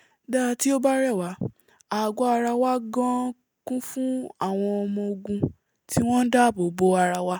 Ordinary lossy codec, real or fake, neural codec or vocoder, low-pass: none; real; none; none